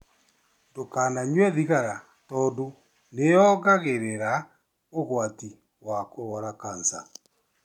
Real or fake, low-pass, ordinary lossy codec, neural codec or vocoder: real; 19.8 kHz; none; none